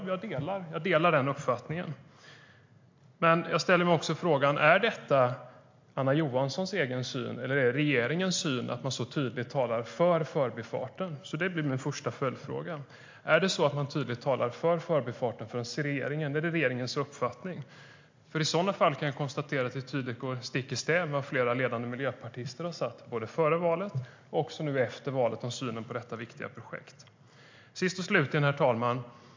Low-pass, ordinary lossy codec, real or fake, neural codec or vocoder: 7.2 kHz; MP3, 48 kbps; real; none